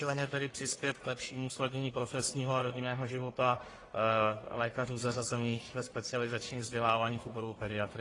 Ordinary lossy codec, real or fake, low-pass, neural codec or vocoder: AAC, 32 kbps; fake; 10.8 kHz; codec, 44.1 kHz, 1.7 kbps, Pupu-Codec